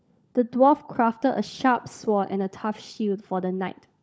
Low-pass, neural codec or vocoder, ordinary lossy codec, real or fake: none; codec, 16 kHz, 16 kbps, FunCodec, trained on LibriTTS, 50 frames a second; none; fake